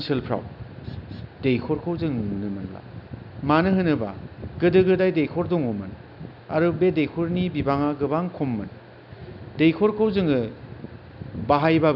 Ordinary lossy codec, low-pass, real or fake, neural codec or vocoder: none; 5.4 kHz; real; none